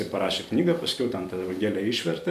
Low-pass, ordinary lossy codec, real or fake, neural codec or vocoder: 14.4 kHz; MP3, 96 kbps; fake; vocoder, 44.1 kHz, 128 mel bands every 256 samples, BigVGAN v2